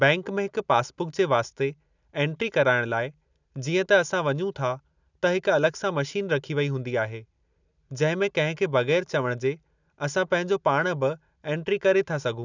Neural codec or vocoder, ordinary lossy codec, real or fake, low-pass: none; none; real; 7.2 kHz